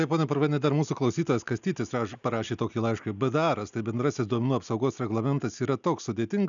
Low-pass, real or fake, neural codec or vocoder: 7.2 kHz; real; none